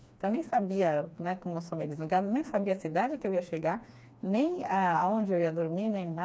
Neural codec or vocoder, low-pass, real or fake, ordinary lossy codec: codec, 16 kHz, 2 kbps, FreqCodec, smaller model; none; fake; none